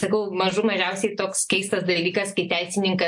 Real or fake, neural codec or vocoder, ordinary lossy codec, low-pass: real; none; AAC, 64 kbps; 10.8 kHz